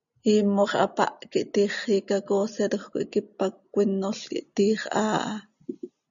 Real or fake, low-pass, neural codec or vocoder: real; 7.2 kHz; none